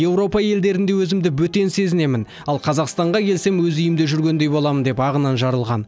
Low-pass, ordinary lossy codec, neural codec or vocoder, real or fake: none; none; none; real